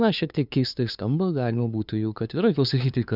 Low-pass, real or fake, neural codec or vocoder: 5.4 kHz; fake; codec, 16 kHz, 2 kbps, FunCodec, trained on LibriTTS, 25 frames a second